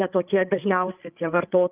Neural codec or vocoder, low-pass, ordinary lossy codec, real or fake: codec, 16 kHz, 8 kbps, FreqCodec, larger model; 3.6 kHz; Opus, 24 kbps; fake